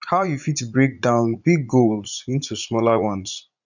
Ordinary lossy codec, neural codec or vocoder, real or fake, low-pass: none; vocoder, 44.1 kHz, 80 mel bands, Vocos; fake; 7.2 kHz